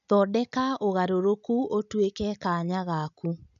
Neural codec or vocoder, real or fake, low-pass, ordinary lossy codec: none; real; 7.2 kHz; none